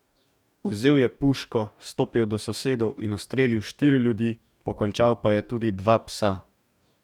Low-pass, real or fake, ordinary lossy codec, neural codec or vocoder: 19.8 kHz; fake; none; codec, 44.1 kHz, 2.6 kbps, DAC